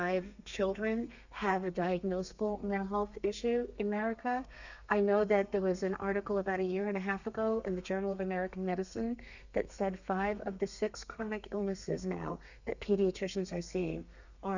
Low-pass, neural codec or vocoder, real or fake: 7.2 kHz; codec, 32 kHz, 1.9 kbps, SNAC; fake